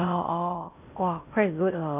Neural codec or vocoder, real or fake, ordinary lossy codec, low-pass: codec, 16 kHz in and 24 kHz out, 0.6 kbps, FocalCodec, streaming, 4096 codes; fake; none; 3.6 kHz